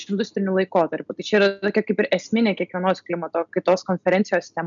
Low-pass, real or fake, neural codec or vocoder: 7.2 kHz; real; none